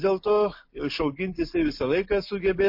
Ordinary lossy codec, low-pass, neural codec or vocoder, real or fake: MP3, 32 kbps; 5.4 kHz; none; real